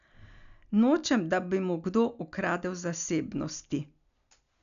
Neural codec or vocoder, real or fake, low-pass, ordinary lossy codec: none; real; 7.2 kHz; none